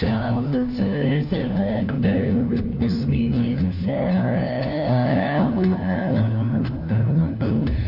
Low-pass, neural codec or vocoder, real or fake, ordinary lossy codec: 5.4 kHz; codec, 16 kHz, 1 kbps, FunCodec, trained on LibriTTS, 50 frames a second; fake; none